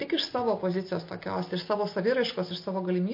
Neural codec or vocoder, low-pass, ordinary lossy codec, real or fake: none; 5.4 kHz; MP3, 32 kbps; real